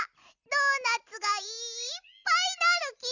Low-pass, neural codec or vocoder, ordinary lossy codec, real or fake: 7.2 kHz; none; none; real